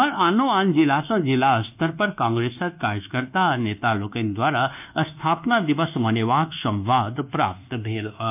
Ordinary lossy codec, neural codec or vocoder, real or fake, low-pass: none; codec, 24 kHz, 1.2 kbps, DualCodec; fake; 3.6 kHz